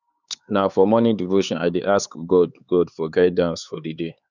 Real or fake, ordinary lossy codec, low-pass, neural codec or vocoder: fake; none; 7.2 kHz; codec, 16 kHz, 4 kbps, X-Codec, HuBERT features, trained on LibriSpeech